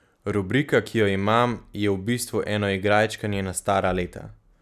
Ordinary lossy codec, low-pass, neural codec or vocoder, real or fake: none; 14.4 kHz; none; real